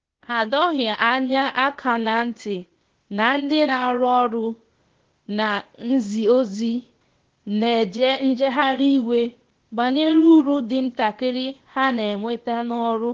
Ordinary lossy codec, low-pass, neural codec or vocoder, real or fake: Opus, 16 kbps; 7.2 kHz; codec, 16 kHz, 0.8 kbps, ZipCodec; fake